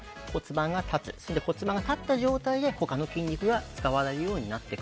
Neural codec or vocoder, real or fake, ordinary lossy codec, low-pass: none; real; none; none